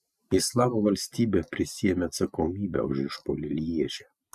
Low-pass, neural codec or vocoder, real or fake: 14.4 kHz; none; real